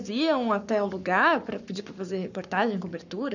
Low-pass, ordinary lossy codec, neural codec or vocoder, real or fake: 7.2 kHz; none; codec, 44.1 kHz, 7.8 kbps, Pupu-Codec; fake